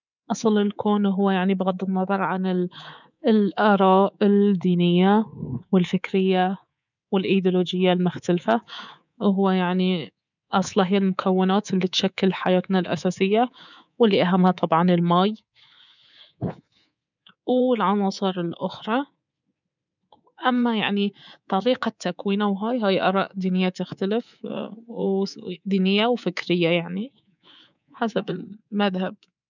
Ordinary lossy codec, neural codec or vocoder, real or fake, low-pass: none; codec, 24 kHz, 3.1 kbps, DualCodec; fake; 7.2 kHz